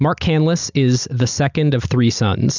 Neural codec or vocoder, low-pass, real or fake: none; 7.2 kHz; real